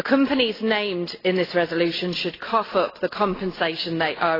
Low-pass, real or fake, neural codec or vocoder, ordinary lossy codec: 5.4 kHz; real; none; AAC, 24 kbps